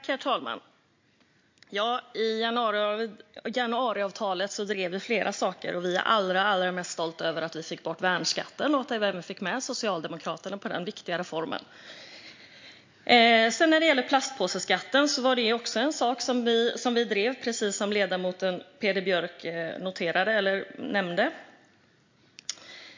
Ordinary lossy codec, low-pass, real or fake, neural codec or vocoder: MP3, 48 kbps; 7.2 kHz; real; none